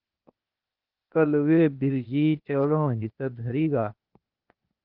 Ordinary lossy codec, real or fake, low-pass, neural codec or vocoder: Opus, 32 kbps; fake; 5.4 kHz; codec, 16 kHz, 0.8 kbps, ZipCodec